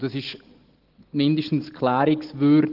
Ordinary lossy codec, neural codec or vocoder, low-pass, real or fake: Opus, 16 kbps; none; 5.4 kHz; real